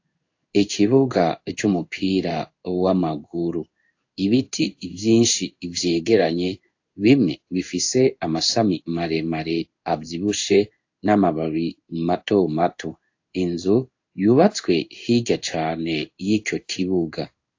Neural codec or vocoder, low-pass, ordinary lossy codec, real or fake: codec, 16 kHz in and 24 kHz out, 1 kbps, XY-Tokenizer; 7.2 kHz; AAC, 48 kbps; fake